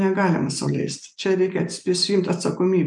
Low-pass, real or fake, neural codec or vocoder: 10.8 kHz; real; none